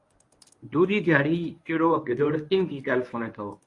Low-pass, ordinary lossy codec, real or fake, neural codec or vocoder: 10.8 kHz; AAC, 48 kbps; fake; codec, 24 kHz, 0.9 kbps, WavTokenizer, medium speech release version 1